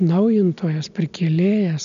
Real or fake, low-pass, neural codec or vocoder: real; 7.2 kHz; none